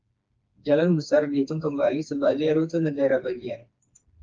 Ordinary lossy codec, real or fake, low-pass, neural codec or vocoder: Opus, 24 kbps; fake; 7.2 kHz; codec, 16 kHz, 2 kbps, FreqCodec, smaller model